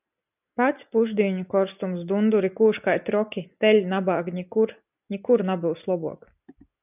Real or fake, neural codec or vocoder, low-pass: real; none; 3.6 kHz